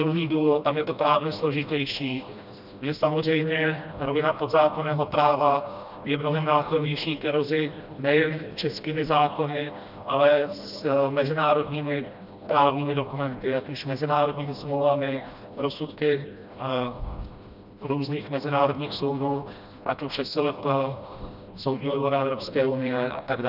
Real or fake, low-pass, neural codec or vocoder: fake; 5.4 kHz; codec, 16 kHz, 1 kbps, FreqCodec, smaller model